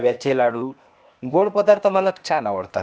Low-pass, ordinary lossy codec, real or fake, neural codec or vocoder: none; none; fake; codec, 16 kHz, 0.8 kbps, ZipCodec